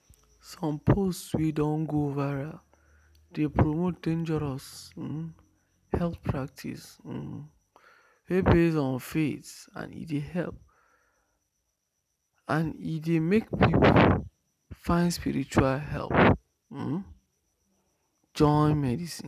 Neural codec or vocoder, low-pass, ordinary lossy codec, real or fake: none; 14.4 kHz; none; real